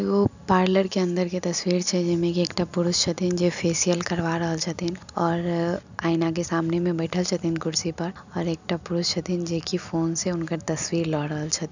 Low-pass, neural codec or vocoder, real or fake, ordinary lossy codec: 7.2 kHz; none; real; none